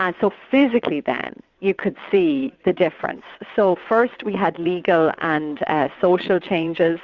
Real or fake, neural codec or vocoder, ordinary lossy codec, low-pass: fake; vocoder, 22.05 kHz, 80 mel bands, WaveNeXt; Opus, 64 kbps; 7.2 kHz